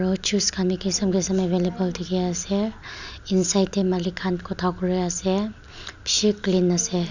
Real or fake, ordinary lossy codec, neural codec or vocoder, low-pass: real; none; none; 7.2 kHz